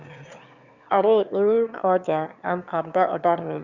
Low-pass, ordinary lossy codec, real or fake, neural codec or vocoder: 7.2 kHz; Opus, 64 kbps; fake; autoencoder, 22.05 kHz, a latent of 192 numbers a frame, VITS, trained on one speaker